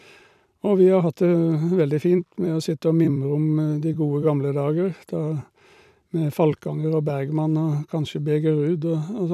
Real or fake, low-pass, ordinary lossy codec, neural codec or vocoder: fake; 14.4 kHz; none; vocoder, 44.1 kHz, 128 mel bands every 256 samples, BigVGAN v2